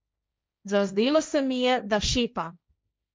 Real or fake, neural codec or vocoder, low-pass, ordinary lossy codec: fake; codec, 16 kHz, 1.1 kbps, Voila-Tokenizer; none; none